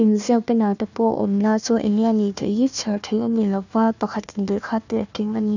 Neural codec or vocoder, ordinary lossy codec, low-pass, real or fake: codec, 16 kHz, 1 kbps, FunCodec, trained on Chinese and English, 50 frames a second; none; 7.2 kHz; fake